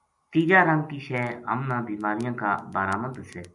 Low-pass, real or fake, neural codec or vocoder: 10.8 kHz; real; none